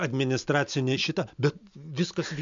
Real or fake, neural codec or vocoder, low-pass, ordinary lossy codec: real; none; 7.2 kHz; MP3, 64 kbps